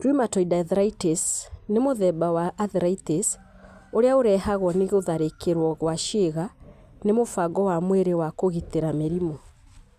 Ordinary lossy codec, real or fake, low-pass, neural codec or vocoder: Opus, 64 kbps; real; 14.4 kHz; none